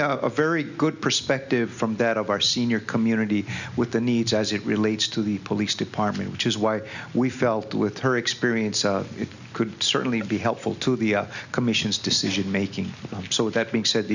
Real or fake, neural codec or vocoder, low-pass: real; none; 7.2 kHz